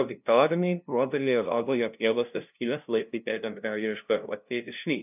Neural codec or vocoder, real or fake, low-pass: codec, 16 kHz, 0.5 kbps, FunCodec, trained on LibriTTS, 25 frames a second; fake; 3.6 kHz